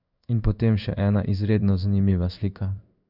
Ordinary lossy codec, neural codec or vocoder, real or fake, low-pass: none; codec, 16 kHz in and 24 kHz out, 1 kbps, XY-Tokenizer; fake; 5.4 kHz